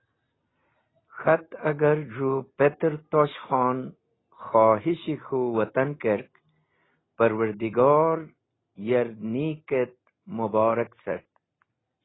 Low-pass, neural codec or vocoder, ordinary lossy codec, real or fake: 7.2 kHz; none; AAC, 16 kbps; real